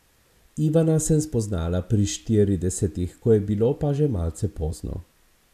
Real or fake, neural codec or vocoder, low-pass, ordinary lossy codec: real; none; 14.4 kHz; none